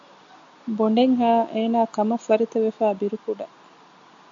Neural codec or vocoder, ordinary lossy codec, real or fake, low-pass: none; AAC, 64 kbps; real; 7.2 kHz